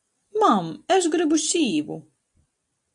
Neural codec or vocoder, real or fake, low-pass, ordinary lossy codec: none; real; 10.8 kHz; AAC, 64 kbps